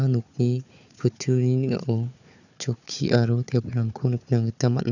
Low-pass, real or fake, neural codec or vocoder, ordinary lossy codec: 7.2 kHz; fake; codec, 16 kHz, 4 kbps, FunCodec, trained on Chinese and English, 50 frames a second; none